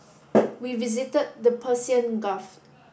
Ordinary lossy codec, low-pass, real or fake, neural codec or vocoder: none; none; real; none